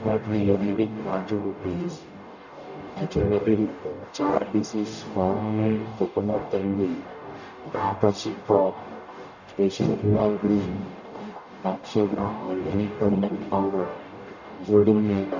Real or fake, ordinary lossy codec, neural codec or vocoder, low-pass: fake; none; codec, 44.1 kHz, 0.9 kbps, DAC; 7.2 kHz